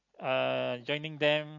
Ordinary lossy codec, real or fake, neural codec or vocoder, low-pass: MP3, 48 kbps; real; none; 7.2 kHz